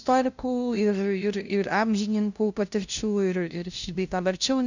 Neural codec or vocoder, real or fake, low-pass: codec, 16 kHz, 0.5 kbps, FunCodec, trained on LibriTTS, 25 frames a second; fake; 7.2 kHz